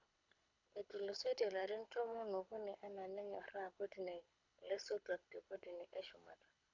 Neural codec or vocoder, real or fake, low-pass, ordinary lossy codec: codec, 24 kHz, 6 kbps, HILCodec; fake; 7.2 kHz; none